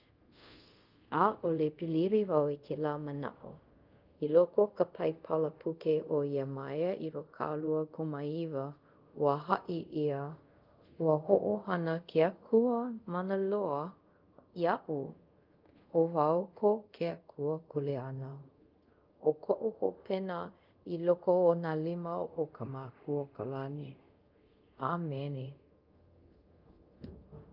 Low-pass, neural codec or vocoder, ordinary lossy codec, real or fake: 5.4 kHz; codec, 24 kHz, 0.5 kbps, DualCodec; Opus, 32 kbps; fake